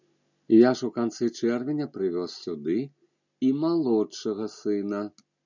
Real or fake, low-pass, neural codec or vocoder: real; 7.2 kHz; none